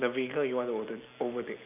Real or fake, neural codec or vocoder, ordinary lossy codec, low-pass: real; none; none; 3.6 kHz